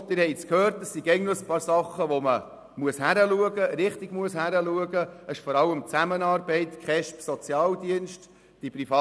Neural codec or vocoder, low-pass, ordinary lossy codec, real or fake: none; none; none; real